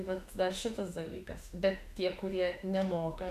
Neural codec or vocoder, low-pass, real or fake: autoencoder, 48 kHz, 32 numbers a frame, DAC-VAE, trained on Japanese speech; 14.4 kHz; fake